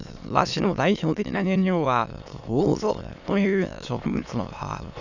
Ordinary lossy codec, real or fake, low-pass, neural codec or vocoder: none; fake; 7.2 kHz; autoencoder, 22.05 kHz, a latent of 192 numbers a frame, VITS, trained on many speakers